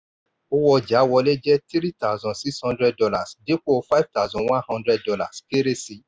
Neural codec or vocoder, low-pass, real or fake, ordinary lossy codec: none; none; real; none